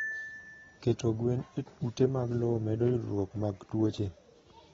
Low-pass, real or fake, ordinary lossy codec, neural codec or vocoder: 7.2 kHz; real; AAC, 24 kbps; none